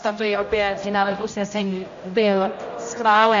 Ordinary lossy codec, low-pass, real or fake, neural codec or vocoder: AAC, 48 kbps; 7.2 kHz; fake; codec, 16 kHz, 0.5 kbps, X-Codec, HuBERT features, trained on general audio